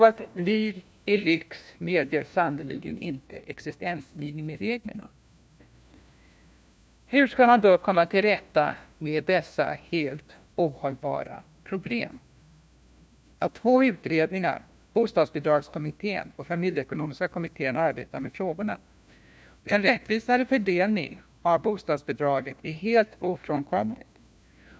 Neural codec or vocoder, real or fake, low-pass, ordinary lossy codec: codec, 16 kHz, 1 kbps, FunCodec, trained on LibriTTS, 50 frames a second; fake; none; none